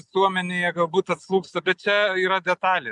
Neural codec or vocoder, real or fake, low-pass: none; real; 10.8 kHz